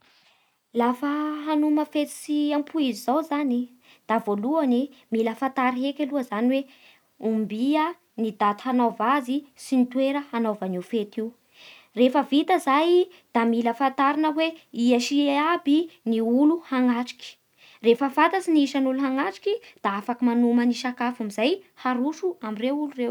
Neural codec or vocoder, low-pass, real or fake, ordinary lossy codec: none; 19.8 kHz; real; none